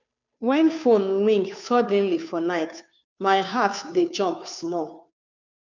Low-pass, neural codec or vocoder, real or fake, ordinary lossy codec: 7.2 kHz; codec, 16 kHz, 2 kbps, FunCodec, trained on Chinese and English, 25 frames a second; fake; none